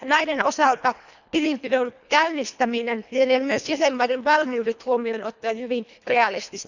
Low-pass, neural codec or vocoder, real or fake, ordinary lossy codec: 7.2 kHz; codec, 24 kHz, 1.5 kbps, HILCodec; fake; none